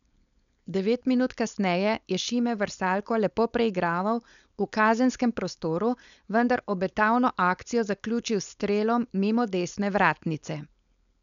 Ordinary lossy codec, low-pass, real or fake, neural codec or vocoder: none; 7.2 kHz; fake; codec, 16 kHz, 4.8 kbps, FACodec